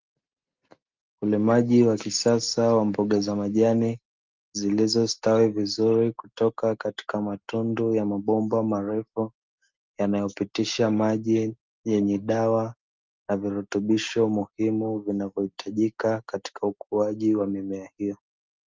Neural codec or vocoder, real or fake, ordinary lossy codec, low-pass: none; real; Opus, 32 kbps; 7.2 kHz